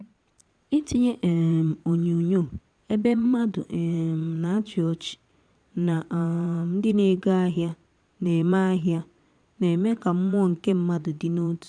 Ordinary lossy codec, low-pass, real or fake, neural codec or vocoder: none; 9.9 kHz; fake; vocoder, 22.05 kHz, 80 mel bands, Vocos